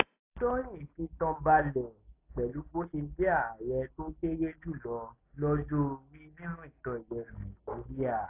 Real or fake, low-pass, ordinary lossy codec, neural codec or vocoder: real; 3.6 kHz; AAC, 24 kbps; none